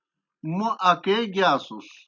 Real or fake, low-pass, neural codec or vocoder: real; 7.2 kHz; none